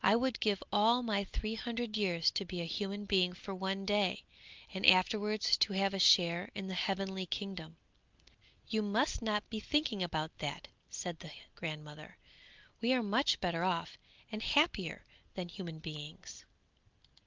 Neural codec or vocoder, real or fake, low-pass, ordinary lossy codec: none; real; 7.2 kHz; Opus, 24 kbps